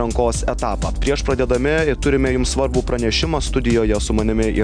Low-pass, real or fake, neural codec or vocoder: 9.9 kHz; real; none